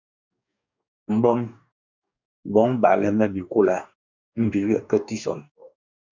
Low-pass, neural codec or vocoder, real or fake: 7.2 kHz; codec, 44.1 kHz, 2.6 kbps, DAC; fake